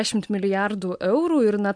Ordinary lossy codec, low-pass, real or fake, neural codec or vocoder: MP3, 64 kbps; 9.9 kHz; real; none